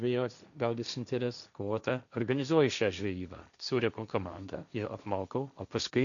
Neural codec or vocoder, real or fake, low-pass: codec, 16 kHz, 1.1 kbps, Voila-Tokenizer; fake; 7.2 kHz